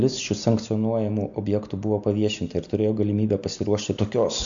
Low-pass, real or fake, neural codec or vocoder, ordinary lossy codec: 7.2 kHz; real; none; AAC, 64 kbps